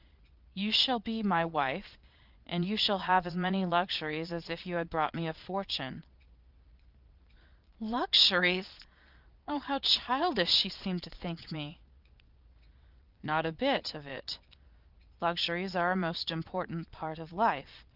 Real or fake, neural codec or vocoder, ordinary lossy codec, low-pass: fake; vocoder, 22.05 kHz, 80 mel bands, Vocos; Opus, 32 kbps; 5.4 kHz